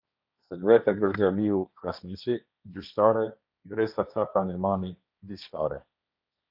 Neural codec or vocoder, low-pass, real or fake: codec, 16 kHz, 1.1 kbps, Voila-Tokenizer; 5.4 kHz; fake